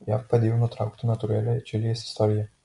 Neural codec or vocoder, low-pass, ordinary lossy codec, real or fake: none; 10.8 kHz; MP3, 64 kbps; real